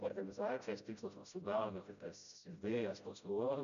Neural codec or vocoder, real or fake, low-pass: codec, 16 kHz, 0.5 kbps, FreqCodec, smaller model; fake; 7.2 kHz